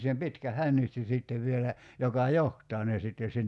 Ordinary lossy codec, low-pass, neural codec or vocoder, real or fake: none; none; none; real